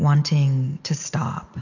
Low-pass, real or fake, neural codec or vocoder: 7.2 kHz; real; none